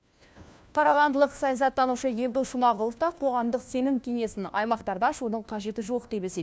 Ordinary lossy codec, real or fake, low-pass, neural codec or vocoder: none; fake; none; codec, 16 kHz, 1 kbps, FunCodec, trained on LibriTTS, 50 frames a second